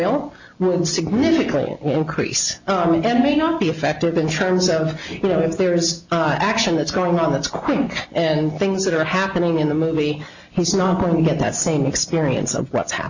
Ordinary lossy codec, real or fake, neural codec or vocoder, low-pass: Opus, 64 kbps; real; none; 7.2 kHz